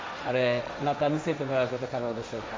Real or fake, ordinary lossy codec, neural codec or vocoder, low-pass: fake; none; codec, 16 kHz, 1.1 kbps, Voila-Tokenizer; none